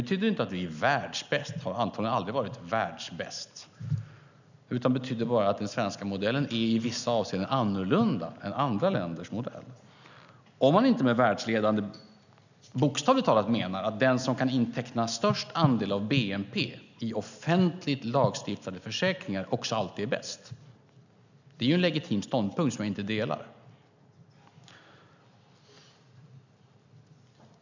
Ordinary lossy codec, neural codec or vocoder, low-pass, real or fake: none; none; 7.2 kHz; real